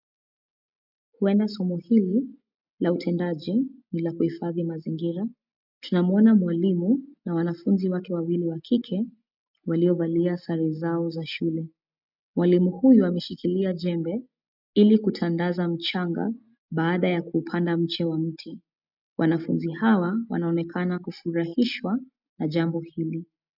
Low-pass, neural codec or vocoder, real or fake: 5.4 kHz; none; real